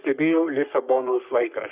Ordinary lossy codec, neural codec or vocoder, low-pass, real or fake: Opus, 64 kbps; codec, 44.1 kHz, 3.4 kbps, Pupu-Codec; 3.6 kHz; fake